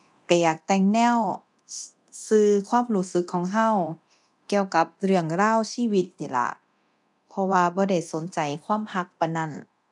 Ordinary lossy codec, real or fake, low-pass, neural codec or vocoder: none; fake; 10.8 kHz; codec, 24 kHz, 0.9 kbps, DualCodec